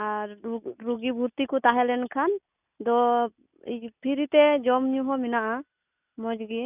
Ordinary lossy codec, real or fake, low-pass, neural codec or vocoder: none; real; 3.6 kHz; none